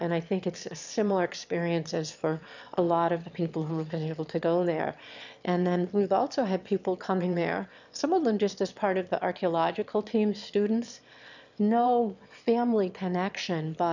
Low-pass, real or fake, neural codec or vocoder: 7.2 kHz; fake; autoencoder, 22.05 kHz, a latent of 192 numbers a frame, VITS, trained on one speaker